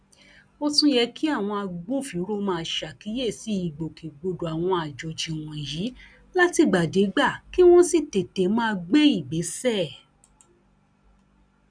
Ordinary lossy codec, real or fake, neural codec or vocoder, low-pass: none; real; none; 9.9 kHz